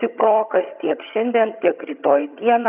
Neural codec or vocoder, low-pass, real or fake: vocoder, 22.05 kHz, 80 mel bands, HiFi-GAN; 3.6 kHz; fake